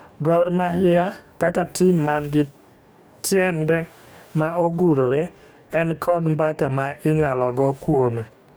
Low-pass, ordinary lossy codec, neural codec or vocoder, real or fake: none; none; codec, 44.1 kHz, 2.6 kbps, DAC; fake